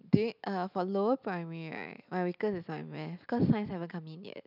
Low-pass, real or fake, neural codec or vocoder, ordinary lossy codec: 5.4 kHz; real; none; none